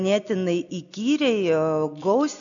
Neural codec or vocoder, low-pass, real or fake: none; 7.2 kHz; real